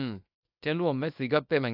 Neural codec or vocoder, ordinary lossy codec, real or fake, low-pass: codec, 24 kHz, 0.9 kbps, WavTokenizer, small release; AAC, 48 kbps; fake; 5.4 kHz